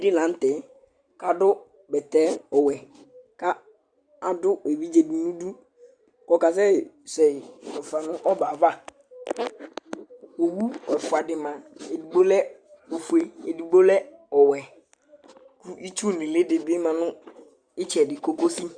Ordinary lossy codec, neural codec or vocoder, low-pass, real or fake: Opus, 64 kbps; none; 9.9 kHz; real